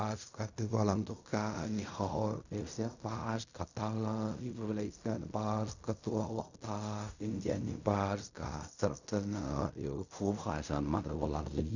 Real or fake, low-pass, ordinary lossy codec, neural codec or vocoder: fake; 7.2 kHz; none; codec, 16 kHz in and 24 kHz out, 0.4 kbps, LongCat-Audio-Codec, fine tuned four codebook decoder